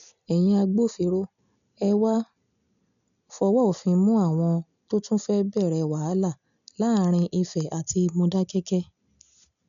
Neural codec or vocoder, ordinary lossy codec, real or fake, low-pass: none; none; real; 7.2 kHz